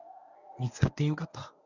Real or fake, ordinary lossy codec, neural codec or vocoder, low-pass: fake; none; codec, 24 kHz, 0.9 kbps, WavTokenizer, medium speech release version 1; 7.2 kHz